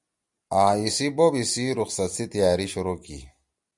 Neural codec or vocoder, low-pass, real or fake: none; 10.8 kHz; real